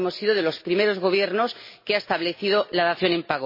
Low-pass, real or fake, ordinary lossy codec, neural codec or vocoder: 5.4 kHz; real; MP3, 24 kbps; none